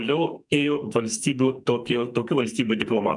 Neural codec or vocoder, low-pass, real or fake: codec, 44.1 kHz, 2.6 kbps, SNAC; 10.8 kHz; fake